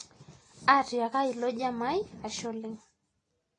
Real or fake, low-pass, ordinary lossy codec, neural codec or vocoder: real; 9.9 kHz; AAC, 32 kbps; none